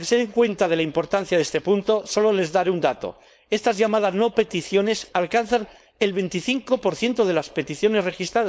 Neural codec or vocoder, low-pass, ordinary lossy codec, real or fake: codec, 16 kHz, 4.8 kbps, FACodec; none; none; fake